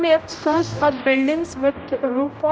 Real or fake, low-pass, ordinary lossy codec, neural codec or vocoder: fake; none; none; codec, 16 kHz, 0.5 kbps, X-Codec, HuBERT features, trained on general audio